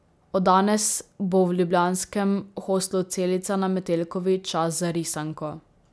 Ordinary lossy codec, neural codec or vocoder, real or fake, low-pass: none; none; real; none